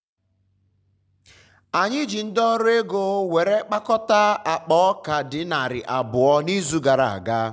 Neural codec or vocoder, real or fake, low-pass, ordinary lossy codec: none; real; none; none